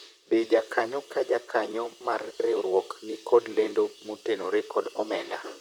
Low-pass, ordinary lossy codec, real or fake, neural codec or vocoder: 19.8 kHz; none; fake; vocoder, 44.1 kHz, 128 mel bands, Pupu-Vocoder